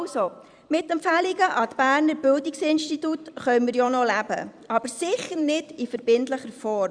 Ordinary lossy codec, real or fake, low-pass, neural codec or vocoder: Opus, 64 kbps; fake; 9.9 kHz; vocoder, 44.1 kHz, 128 mel bands every 256 samples, BigVGAN v2